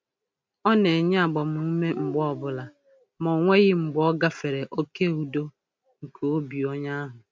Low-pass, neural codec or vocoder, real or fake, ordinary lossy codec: none; none; real; none